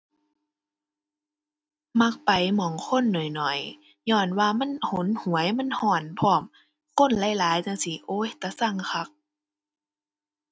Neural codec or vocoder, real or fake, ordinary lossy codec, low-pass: none; real; none; none